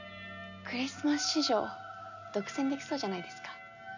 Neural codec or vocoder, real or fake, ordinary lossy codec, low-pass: none; real; none; 7.2 kHz